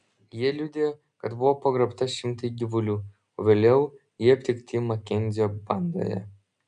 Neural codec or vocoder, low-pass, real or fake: none; 9.9 kHz; real